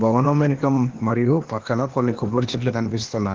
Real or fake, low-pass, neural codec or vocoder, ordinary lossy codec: fake; 7.2 kHz; codec, 16 kHz, 0.8 kbps, ZipCodec; Opus, 16 kbps